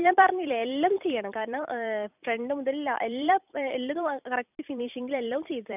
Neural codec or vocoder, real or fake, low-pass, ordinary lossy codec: vocoder, 44.1 kHz, 128 mel bands every 256 samples, BigVGAN v2; fake; 3.6 kHz; none